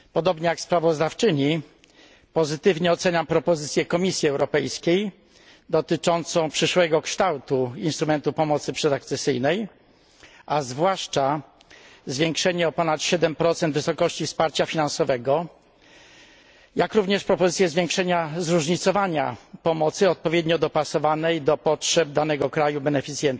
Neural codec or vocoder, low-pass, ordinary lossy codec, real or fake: none; none; none; real